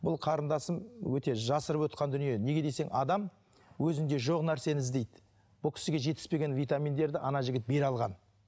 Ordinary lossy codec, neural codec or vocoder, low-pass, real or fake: none; none; none; real